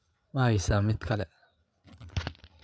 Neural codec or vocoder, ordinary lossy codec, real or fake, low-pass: codec, 16 kHz, 8 kbps, FreqCodec, larger model; none; fake; none